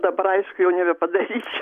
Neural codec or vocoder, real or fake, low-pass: none; real; 14.4 kHz